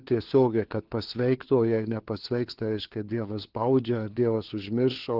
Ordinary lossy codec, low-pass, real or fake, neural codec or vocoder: Opus, 16 kbps; 5.4 kHz; fake; codec, 16 kHz, 4 kbps, FunCodec, trained on LibriTTS, 50 frames a second